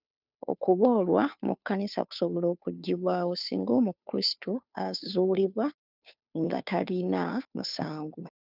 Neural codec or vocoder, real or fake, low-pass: codec, 16 kHz, 2 kbps, FunCodec, trained on Chinese and English, 25 frames a second; fake; 5.4 kHz